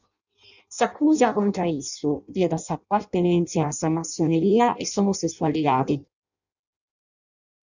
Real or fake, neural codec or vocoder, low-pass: fake; codec, 16 kHz in and 24 kHz out, 0.6 kbps, FireRedTTS-2 codec; 7.2 kHz